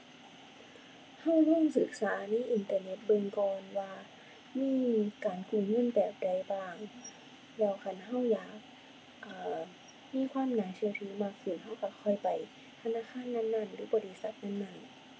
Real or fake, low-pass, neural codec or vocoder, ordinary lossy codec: real; none; none; none